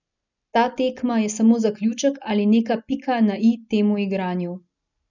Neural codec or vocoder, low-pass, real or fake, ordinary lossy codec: none; 7.2 kHz; real; none